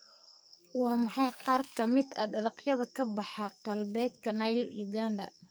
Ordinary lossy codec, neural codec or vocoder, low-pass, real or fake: none; codec, 44.1 kHz, 2.6 kbps, SNAC; none; fake